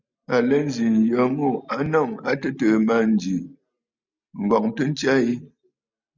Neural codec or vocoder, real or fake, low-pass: none; real; 7.2 kHz